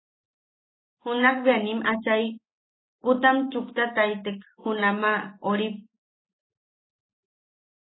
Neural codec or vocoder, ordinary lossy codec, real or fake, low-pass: none; AAC, 16 kbps; real; 7.2 kHz